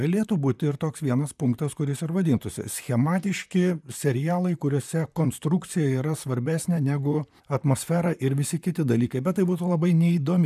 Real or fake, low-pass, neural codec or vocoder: fake; 14.4 kHz; vocoder, 44.1 kHz, 128 mel bands, Pupu-Vocoder